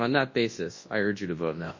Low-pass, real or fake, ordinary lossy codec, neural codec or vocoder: 7.2 kHz; fake; MP3, 32 kbps; codec, 24 kHz, 0.9 kbps, WavTokenizer, large speech release